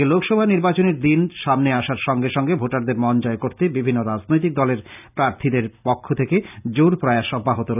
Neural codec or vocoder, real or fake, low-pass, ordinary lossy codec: none; real; 3.6 kHz; none